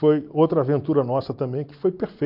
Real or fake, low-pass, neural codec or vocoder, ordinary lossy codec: real; 5.4 kHz; none; MP3, 48 kbps